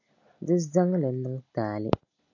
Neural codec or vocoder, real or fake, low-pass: none; real; 7.2 kHz